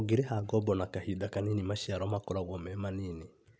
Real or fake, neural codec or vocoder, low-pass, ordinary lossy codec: real; none; none; none